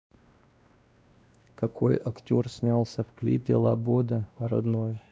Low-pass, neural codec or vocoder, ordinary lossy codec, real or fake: none; codec, 16 kHz, 1 kbps, X-Codec, WavLM features, trained on Multilingual LibriSpeech; none; fake